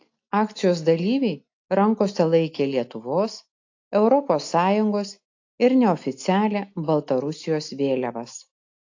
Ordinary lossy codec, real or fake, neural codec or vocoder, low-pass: AAC, 48 kbps; real; none; 7.2 kHz